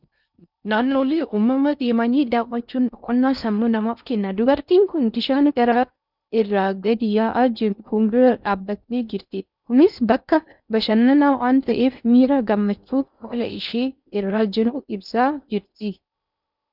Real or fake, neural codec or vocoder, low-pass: fake; codec, 16 kHz in and 24 kHz out, 0.6 kbps, FocalCodec, streaming, 4096 codes; 5.4 kHz